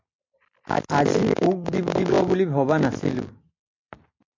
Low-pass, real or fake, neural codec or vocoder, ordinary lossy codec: 7.2 kHz; real; none; MP3, 48 kbps